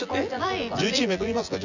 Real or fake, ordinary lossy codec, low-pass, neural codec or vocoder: fake; none; 7.2 kHz; vocoder, 24 kHz, 100 mel bands, Vocos